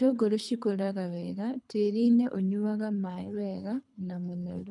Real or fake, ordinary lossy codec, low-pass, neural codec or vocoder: fake; none; 10.8 kHz; codec, 24 kHz, 3 kbps, HILCodec